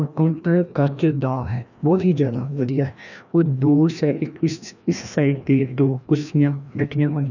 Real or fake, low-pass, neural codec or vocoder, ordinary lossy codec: fake; 7.2 kHz; codec, 16 kHz, 1 kbps, FreqCodec, larger model; none